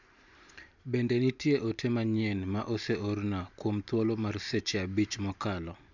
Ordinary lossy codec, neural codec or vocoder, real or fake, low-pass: none; vocoder, 44.1 kHz, 128 mel bands every 512 samples, BigVGAN v2; fake; 7.2 kHz